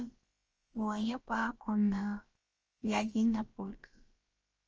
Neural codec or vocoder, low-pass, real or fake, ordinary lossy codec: codec, 16 kHz, about 1 kbps, DyCAST, with the encoder's durations; 7.2 kHz; fake; Opus, 24 kbps